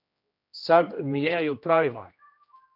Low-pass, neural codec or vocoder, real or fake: 5.4 kHz; codec, 16 kHz, 1 kbps, X-Codec, HuBERT features, trained on general audio; fake